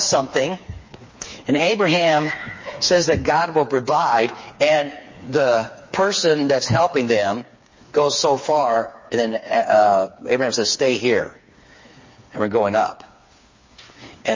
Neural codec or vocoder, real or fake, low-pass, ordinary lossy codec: codec, 16 kHz, 4 kbps, FreqCodec, smaller model; fake; 7.2 kHz; MP3, 32 kbps